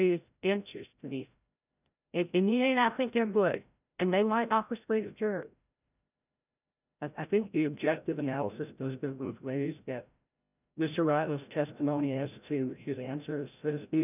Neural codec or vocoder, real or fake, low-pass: codec, 16 kHz, 0.5 kbps, FreqCodec, larger model; fake; 3.6 kHz